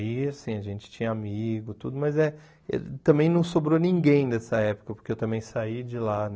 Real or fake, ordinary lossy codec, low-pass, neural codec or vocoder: real; none; none; none